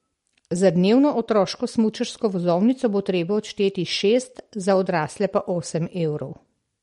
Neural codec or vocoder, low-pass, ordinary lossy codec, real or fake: none; 19.8 kHz; MP3, 48 kbps; real